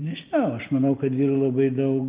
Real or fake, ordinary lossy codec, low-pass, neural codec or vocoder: real; Opus, 32 kbps; 3.6 kHz; none